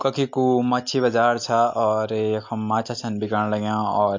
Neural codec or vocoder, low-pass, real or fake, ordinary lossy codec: none; 7.2 kHz; real; MP3, 48 kbps